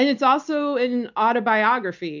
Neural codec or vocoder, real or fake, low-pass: none; real; 7.2 kHz